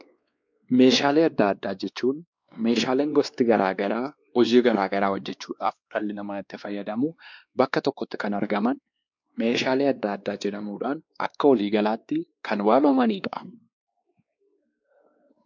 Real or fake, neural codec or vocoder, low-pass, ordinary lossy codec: fake; codec, 16 kHz, 2 kbps, X-Codec, WavLM features, trained on Multilingual LibriSpeech; 7.2 kHz; MP3, 64 kbps